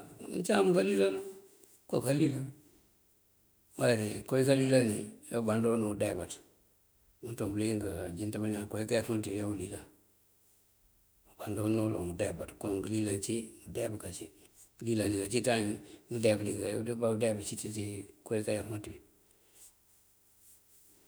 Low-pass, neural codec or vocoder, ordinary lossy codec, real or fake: none; autoencoder, 48 kHz, 32 numbers a frame, DAC-VAE, trained on Japanese speech; none; fake